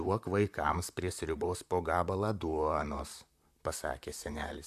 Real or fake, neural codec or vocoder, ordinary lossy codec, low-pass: fake; vocoder, 44.1 kHz, 128 mel bands, Pupu-Vocoder; AAC, 96 kbps; 14.4 kHz